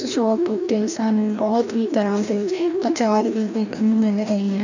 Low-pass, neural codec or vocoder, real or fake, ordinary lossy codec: 7.2 kHz; codec, 16 kHz, 1 kbps, FreqCodec, larger model; fake; none